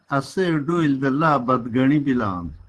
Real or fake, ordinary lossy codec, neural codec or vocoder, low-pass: fake; Opus, 16 kbps; vocoder, 24 kHz, 100 mel bands, Vocos; 10.8 kHz